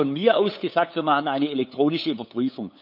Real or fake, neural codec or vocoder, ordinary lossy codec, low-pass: fake; codec, 16 kHz, 8 kbps, FunCodec, trained on LibriTTS, 25 frames a second; none; 5.4 kHz